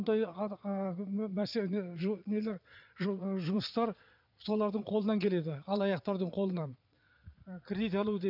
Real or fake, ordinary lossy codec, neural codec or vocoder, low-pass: fake; none; vocoder, 22.05 kHz, 80 mel bands, Vocos; 5.4 kHz